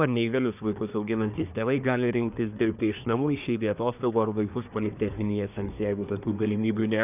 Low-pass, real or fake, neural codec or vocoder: 3.6 kHz; fake; codec, 24 kHz, 1 kbps, SNAC